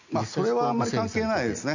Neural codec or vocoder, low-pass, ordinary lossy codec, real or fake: none; 7.2 kHz; none; real